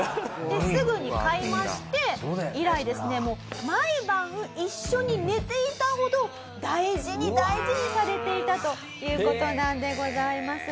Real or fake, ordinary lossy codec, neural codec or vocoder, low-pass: real; none; none; none